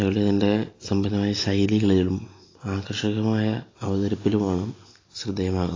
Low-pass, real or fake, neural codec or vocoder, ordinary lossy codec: 7.2 kHz; real; none; AAC, 32 kbps